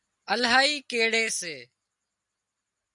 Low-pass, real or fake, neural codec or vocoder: 10.8 kHz; real; none